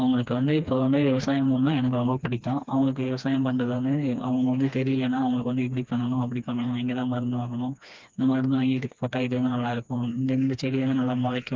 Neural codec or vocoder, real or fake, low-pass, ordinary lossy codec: codec, 16 kHz, 2 kbps, FreqCodec, smaller model; fake; 7.2 kHz; Opus, 24 kbps